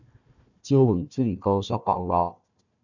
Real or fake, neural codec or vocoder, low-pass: fake; codec, 16 kHz, 1 kbps, FunCodec, trained on Chinese and English, 50 frames a second; 7.2 kHz